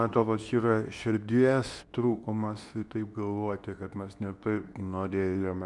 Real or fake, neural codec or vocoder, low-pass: fake; codec, 24 kHz, 0.9 kbps, WavTokenizer, medium speech release version 2; 10.8 kHz